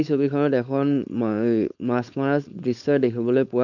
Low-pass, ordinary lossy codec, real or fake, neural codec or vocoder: 7.2 kHz; none; fake; codec, 16 kHz, 4.8 kbps, FACodec